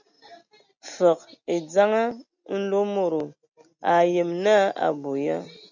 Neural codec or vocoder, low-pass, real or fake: none; 7.2 kHz; real